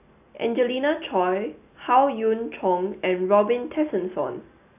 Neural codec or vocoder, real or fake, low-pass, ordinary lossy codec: none; real; 3.6 kHz; none